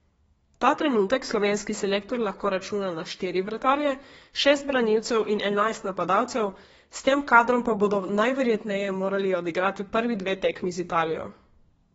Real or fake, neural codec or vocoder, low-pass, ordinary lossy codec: fake; codec, 32 kHz, 1.9 kbps, SNAC; 14.4 kHz; AAC, 24 kbps